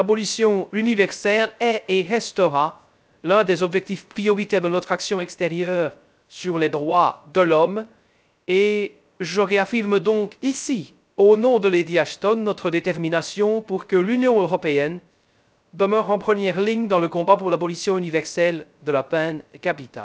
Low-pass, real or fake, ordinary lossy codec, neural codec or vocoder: none; fake; none; codec, 16 kHz, 0.3 kbps, FocalCodec